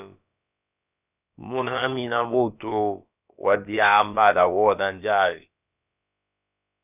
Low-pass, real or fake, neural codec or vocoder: 3.6 kHz; fake; codec, 16 kHz, about 1 kbps, DyCAST, with the encoder's durations